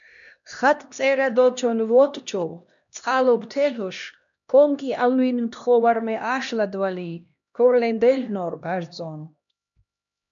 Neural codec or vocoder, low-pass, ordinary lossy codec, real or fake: codec, 16 kHz, 1 kbps, X-Codec, HuBERT features, trained on LibriSpeech; 7.2 kHz; MP3, 96 kbps; fake